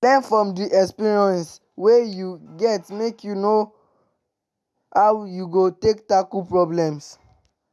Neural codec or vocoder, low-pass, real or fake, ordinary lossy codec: none; none; real; none